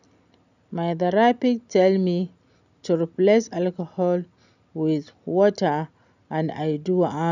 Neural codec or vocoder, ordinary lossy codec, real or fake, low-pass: none; none; real; 7.2 kHz